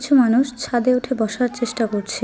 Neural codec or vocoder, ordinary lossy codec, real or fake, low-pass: none; none; real; none